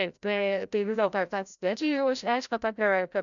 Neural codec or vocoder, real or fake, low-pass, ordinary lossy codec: codec, 16 kHz, 0.5 kbps, FreqCodec, larger model; fake; 7.2 kHz; MP3, 96 kbps